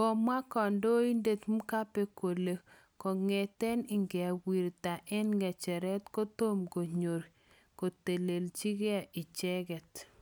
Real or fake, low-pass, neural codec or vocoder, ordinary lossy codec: real; none; none; none